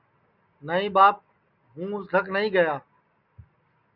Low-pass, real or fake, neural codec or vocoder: 5.4 kHz; real; none